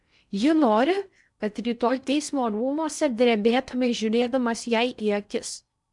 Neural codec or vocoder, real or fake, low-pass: codec, 16 kHz in and 24 kHz out, 0.6 kbps, FocalCodec, streaming, 4096 codes; fake; 10.8 kHz